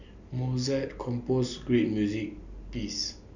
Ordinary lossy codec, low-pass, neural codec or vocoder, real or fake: none; 7.2 kHz; none; real